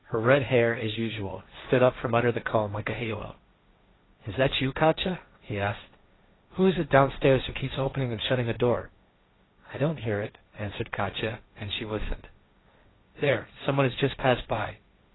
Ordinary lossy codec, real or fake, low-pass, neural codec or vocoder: AAC, 16 kbps; fake; 7.2 kHz; codec, 16 kHz, 1.1 kbps, Voila-Tokenizer